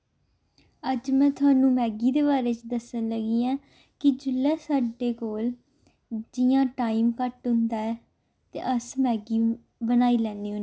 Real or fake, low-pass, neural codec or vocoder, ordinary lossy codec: real; none; none; none